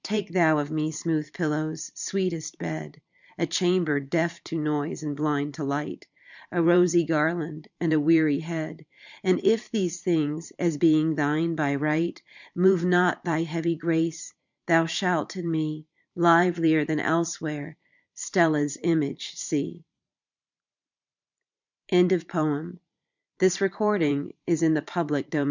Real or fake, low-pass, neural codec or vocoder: fake; 7.2 kHz; vocoder, 44.1 kHz, 128 mel bands every 512 samples, BigVGAN v2